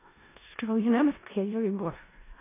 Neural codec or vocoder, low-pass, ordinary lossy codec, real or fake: codec, 16 kHz in and 24 kHz out, 0.4 kbps, LongCat-Audio-Codec, four codebook decoder; 3.6 kHz; AAC, 16 kbps; fake